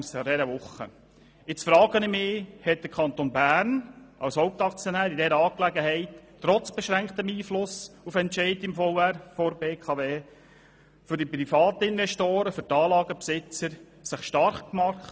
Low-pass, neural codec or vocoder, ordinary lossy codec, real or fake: none; none; none; real